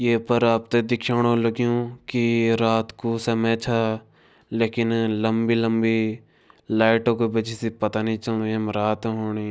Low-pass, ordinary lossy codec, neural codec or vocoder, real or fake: none; none; none; real